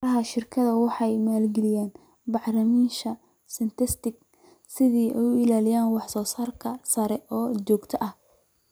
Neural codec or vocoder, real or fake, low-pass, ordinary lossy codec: none; real; none; none